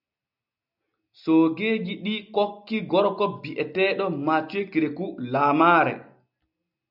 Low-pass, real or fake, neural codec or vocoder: 5.4 kHz; real; none